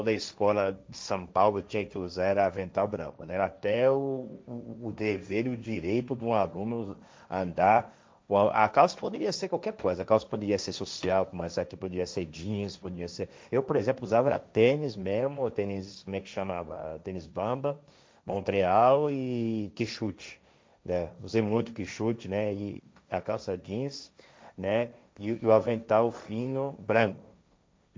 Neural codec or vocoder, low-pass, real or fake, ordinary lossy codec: codec, 16 kHz, 1.1 kbps, Voila-Tokenizer; none; fake; none